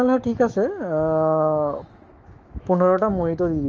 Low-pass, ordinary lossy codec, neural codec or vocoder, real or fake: 7.2 kHz; Opus, 24 kbps; autoencoder, 48 kHz, 128 numbers a frame, DAC-VAE, trained on Japanese speech; fake